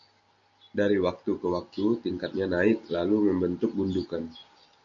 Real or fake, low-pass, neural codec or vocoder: real; 7.2 kHz; none